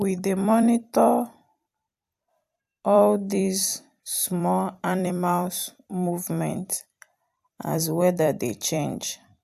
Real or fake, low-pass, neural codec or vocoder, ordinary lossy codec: fake; 14.4 kHz; vocoder, 44.1 kHz, 128 mel bands every 256 samples, BigVGAN v2; AAC, 96 kbps